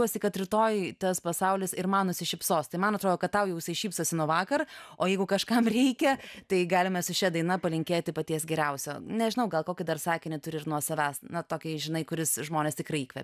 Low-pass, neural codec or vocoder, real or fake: 14.4 kHz; none; real